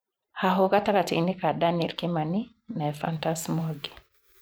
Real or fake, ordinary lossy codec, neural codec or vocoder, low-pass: fake; none; vocoder, 44.1 kHz, 128 mel bands every 512 samples, BigVGAN v2; none